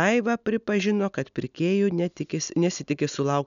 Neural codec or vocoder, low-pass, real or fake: none; 7.2 kHz; real